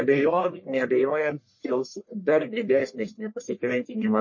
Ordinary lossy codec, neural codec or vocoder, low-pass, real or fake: MP3, 32 kbps; codec, 44.1 kHz, 1.7 kbps, Pupu-Codec; 7.2 kHz; fake